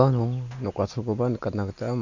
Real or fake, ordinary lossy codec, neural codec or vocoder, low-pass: real; none; none; 7.2 kHz